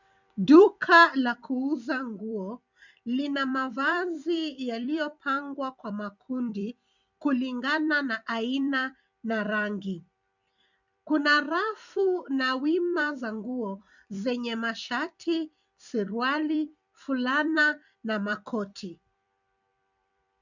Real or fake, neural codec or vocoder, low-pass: fake; vocoder, 44.1 kHz, 128 mel bands every 256 samples, BigVGAN v2; 7.2 kHz